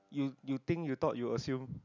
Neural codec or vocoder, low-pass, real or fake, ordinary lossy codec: none; 7.2 kHz; real; none